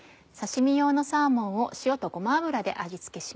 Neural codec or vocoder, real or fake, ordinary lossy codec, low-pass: none; real; none; none